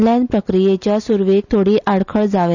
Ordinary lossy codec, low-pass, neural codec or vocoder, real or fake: none; 7.2 kHz; none; real